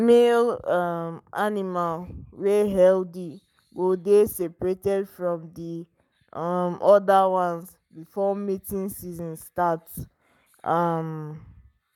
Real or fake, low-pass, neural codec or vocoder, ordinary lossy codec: fake; 19.8 kHz; codec, 44.1 kHz, 7.8 kbps, Pupu-Codec; none